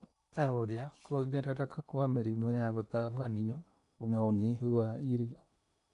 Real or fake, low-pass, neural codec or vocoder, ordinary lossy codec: fake; 10.8 kHz; codec, 16 kHz in and 24 kHz out, 0.8 kbps, FocalCodec, streaming, 65536 codes; none